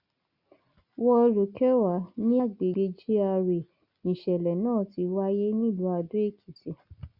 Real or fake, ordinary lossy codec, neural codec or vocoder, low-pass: real; Opus, 64 kbps; none; 5.4 kHz